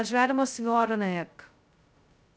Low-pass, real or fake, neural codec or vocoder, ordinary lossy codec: none; fake; codec, 16 kHz, 0.2 kbps, FocalCodec; none